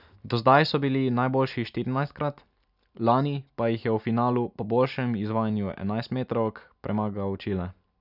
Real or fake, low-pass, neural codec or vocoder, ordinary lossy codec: real; 5.4 kHz; none; none